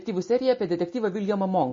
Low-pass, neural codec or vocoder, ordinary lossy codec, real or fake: 7.2 kHz; none; MP3, 32 kbps; real